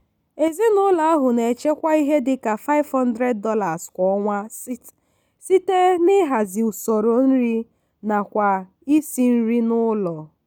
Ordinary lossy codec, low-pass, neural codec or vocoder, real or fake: none; 19.8 kHz; none; real